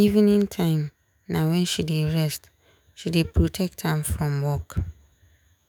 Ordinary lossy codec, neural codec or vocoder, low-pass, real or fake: none; autoencoder, 48 kHz, 128 numbers a frame, DAC-VAE, trained on Japanese speech; none; fake